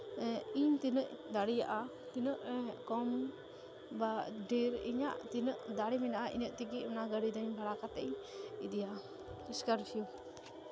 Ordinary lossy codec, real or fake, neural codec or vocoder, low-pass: none; real; none; none